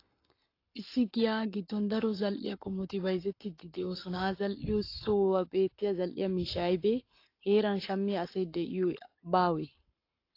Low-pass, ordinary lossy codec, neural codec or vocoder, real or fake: 5.4 kHz; AAC, 32 kbps; codec, 44.1 kHz, 7.8 kbps, Pupu-Codec; fake